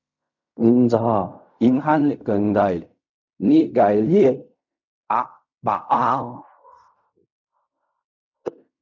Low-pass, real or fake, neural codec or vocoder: 7.2 kHz; fake; codec, 16 kHz in and 24 kHz out, 0.4 kbps, LongCat-Audio-Codec, fine tuned four codebook decoder